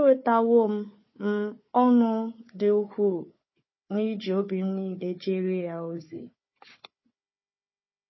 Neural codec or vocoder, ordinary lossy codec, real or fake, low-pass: codec, 16 kHz, 4 kbps, FunCodec, trained on Chinese and English, 50 frames a second; MP3, 24 kbps; fake; 7.2 kHz